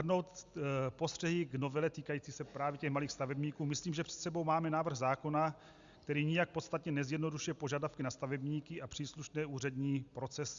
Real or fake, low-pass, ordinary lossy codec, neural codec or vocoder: real; 7.2 kHz; Opus, 64 kbps; none